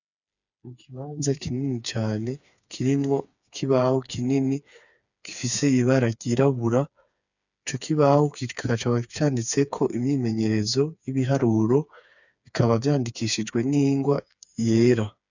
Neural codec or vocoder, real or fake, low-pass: codec, 16 kHz, 4 kbps, FreqCodec, smaller model; fake; 7.2 kHz